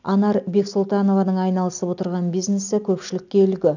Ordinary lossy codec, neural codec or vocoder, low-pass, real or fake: none; none; 7.2 kHz; real